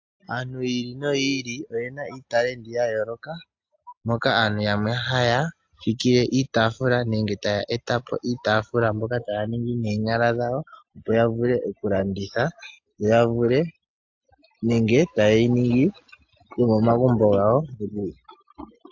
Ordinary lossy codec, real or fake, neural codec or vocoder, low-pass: AAC, 48 kbps; real; none; 7.2 kHz